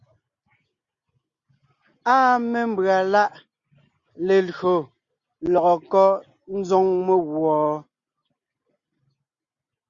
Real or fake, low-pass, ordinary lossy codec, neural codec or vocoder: real; 7.2 kHz; Opus, 64 kbps; none